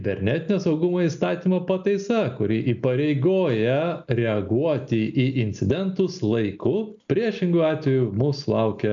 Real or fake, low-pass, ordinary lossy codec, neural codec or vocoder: real; 7.2 kHz; MP3, 96 kbps; none